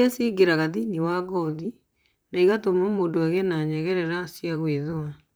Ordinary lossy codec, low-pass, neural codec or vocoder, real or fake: none; none; vocoder, 44.1 kHz, 128 mel bands, Pupu-Vocoder; fake